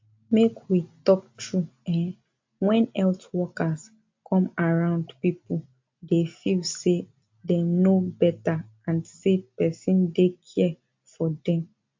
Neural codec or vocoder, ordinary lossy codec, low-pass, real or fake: none; MP3, 48 kbps; 7.2 kHz; real